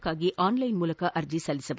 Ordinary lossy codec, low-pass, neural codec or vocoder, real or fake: none; none; none; real